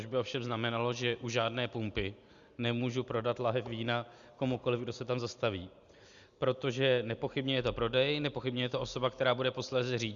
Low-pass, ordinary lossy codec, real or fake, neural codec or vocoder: 7.2 kHz; Opus, 64 kbps; real; none